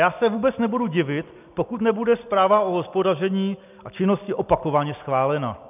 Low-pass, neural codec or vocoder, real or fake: 3.6 kHz; none; real